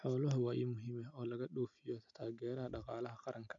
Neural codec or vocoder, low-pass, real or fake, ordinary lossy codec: none; 7.2 kHz; real; none